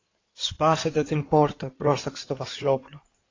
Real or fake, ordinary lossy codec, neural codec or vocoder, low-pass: fake; AAC, 32 kbps; codec, 16 kHz in and 24 kHz out, 2.2 kbps, FireRedTTS-2 codec; 7.2 kHz